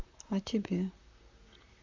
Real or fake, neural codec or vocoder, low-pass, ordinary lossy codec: fake; vocoder, 44.1 kHz, 128 mel bands, Pupu-Vocoder; 7.2 kHz; MP3, 64 kbps